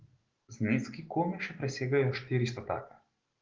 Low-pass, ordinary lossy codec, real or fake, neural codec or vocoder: 7.2 kHz; Opus, 16 kbps; real; none